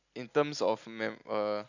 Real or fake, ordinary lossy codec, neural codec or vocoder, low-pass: real; none; none; 7.2 kHz